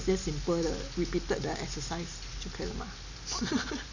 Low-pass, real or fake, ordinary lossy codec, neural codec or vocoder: 7.2 kHz; real; none; none